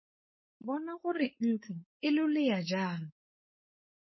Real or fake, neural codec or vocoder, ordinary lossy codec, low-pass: fake; codec, 16 kHz, 4.8 kbps, FACodec; MP3, 24 kbps; 7.2 kHz